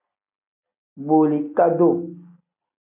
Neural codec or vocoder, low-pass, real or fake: none; 3.6 kHz; real